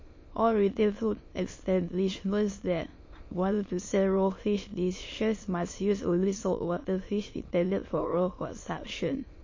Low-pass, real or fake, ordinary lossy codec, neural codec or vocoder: 7.2 kHz; fake; MP3, 32 kbps; autoencoder, 22.05 kHz, a latent of 192 numbers a frame, VITS, trained on many speakers